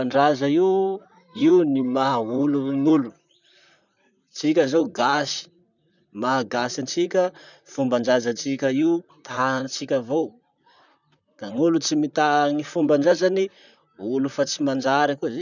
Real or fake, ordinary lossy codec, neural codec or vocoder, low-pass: fake; none; vocoder, 44.1 kHz, 128 mel bands, Pupu-Vocoder; 7.2 kHz